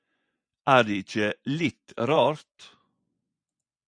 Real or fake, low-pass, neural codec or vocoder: fake; 9.9 kHz; vocoder, 24 kHz, 100 mel bands, Vocos